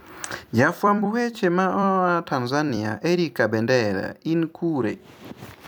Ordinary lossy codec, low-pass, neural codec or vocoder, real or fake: none; none; vocoder, 44.1 kHz, 128 mel bands every 256 samples, BigVGAN v2; fake